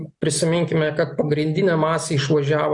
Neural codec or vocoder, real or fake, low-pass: none; real; 10.8 kHz